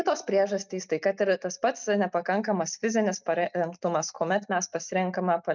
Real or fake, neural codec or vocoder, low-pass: real; none; 7.2 kHz